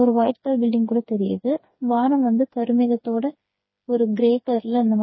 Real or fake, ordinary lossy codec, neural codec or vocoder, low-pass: fake; MP3, 24 kbps; codec, 16 kHz, 4 kbps, FreqCodec, smaller model; 7.2 kHz